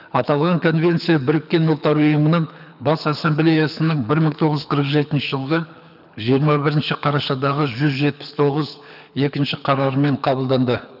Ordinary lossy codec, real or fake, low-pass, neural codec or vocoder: none; fake; 5.4 kHz; codec, 24 kHz, 6 kbps, HILCodec